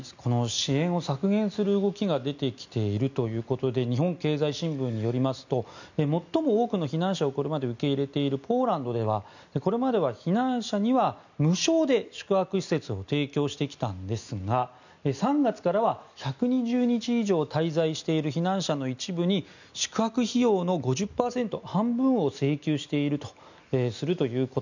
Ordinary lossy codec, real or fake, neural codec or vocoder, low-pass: none; real; none; 7.2 kHz